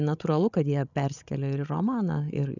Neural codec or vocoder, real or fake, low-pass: codec, 16 kHz, 16 kbps, FreqCodec, larger model; fake; 7.2 kHz